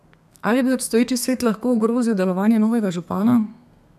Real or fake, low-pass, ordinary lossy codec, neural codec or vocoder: fake; 14.4 kHz; none; codec, 32 kHz, 1.9 kbps, SNAC